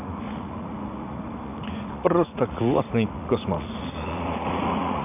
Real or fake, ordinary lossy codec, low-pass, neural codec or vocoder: fake; none; 3.6 kHz; vocoder, 44.1 kHz, 80 mel bands, Vocos